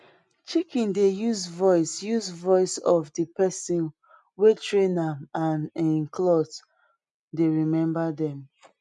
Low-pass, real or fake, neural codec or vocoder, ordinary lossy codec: 10.8 kHz; real; none; none